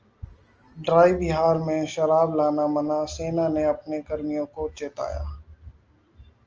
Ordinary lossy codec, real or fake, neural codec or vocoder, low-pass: Opus, 24 kbps; real; none; 7.2 kHz